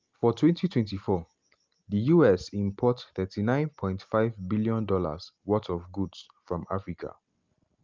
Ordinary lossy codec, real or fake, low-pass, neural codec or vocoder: Opus, 32 kbps; real; 7.2 kHz; none